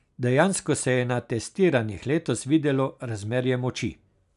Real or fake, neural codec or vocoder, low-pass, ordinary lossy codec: real; none; 10.8 kHz; none